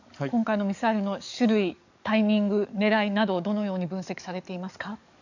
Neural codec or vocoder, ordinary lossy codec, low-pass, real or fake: codec, 44.1 kHz, 7.8 kbps, DAC; none; 7.2 kHz; fake